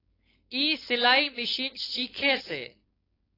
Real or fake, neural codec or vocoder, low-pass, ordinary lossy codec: fake; codec, 16 kHz, 4.8 kbps, FACodec; 5.4 kHz; AAC, 24 kbps